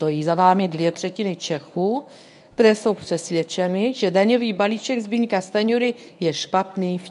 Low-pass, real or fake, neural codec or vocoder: 10.8 kHz; fake; codec, 24 kHz, 0.9 kbps, WavTokenizer, medium speech release version 1